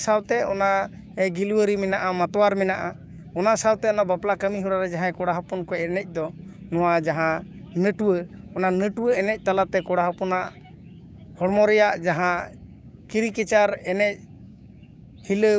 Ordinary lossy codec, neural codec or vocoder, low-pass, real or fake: none; codec, 16 kHz, 6 kbps, DAC; none; fake